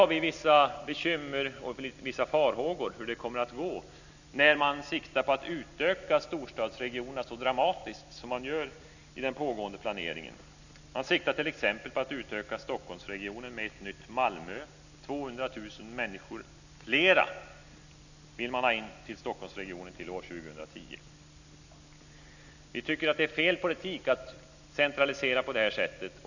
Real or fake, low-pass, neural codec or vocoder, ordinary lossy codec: real; 7.2 kHz; none; none